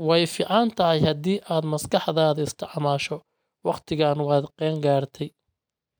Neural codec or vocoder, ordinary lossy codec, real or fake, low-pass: none; none; real; none